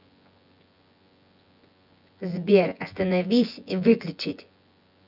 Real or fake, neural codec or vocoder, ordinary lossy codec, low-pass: fake; vocoder, 24 kHz, 100 mel bands, Vocos; none; 5.4 kHz